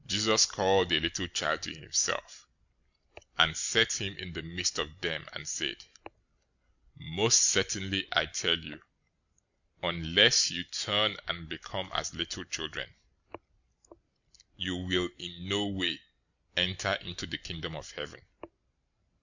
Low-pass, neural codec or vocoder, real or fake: 7.2 kHz; none; real